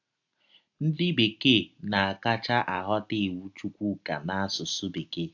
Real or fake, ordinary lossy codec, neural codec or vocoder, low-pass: real; AAC, 48 kbps; none; 7.2 kHz